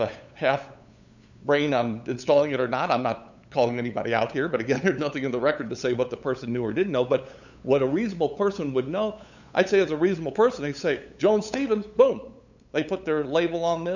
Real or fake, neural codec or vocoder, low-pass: fake; codec, 16 kHz, 8 kbps, FunCodec, trained on LibriTTS, 25 frames a second; 7.2 kHz